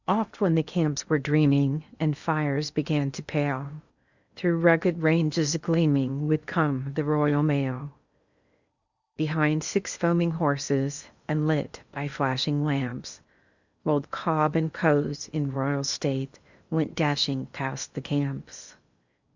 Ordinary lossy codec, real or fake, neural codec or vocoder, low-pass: Opus, 64 kbps; fake; codec, 16 kHz in and 24 kHz out, 0.8 kbps, FocalCodec, streaming, 65536 codes; 7.2 kHz